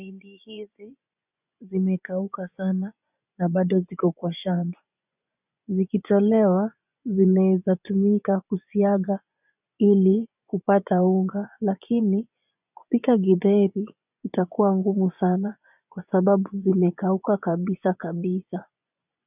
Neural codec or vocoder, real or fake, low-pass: vocoder, 24 kHz, 100 mel bands, Vocos; fake; 3.6 kHz